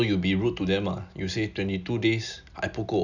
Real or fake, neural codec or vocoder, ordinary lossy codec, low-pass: real; none; none; 7.2 kHz